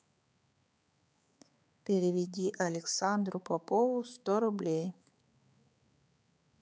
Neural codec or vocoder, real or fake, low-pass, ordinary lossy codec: codec, 16 kHz, 4 kbps, X-Codec, HuBERT features, trained on balanced general audio; fake; none; none